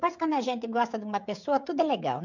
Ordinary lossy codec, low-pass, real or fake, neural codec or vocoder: none; 7.2 kHz; fake; codec, 16 kHz, 16 kbps, FreqCodec, smaller model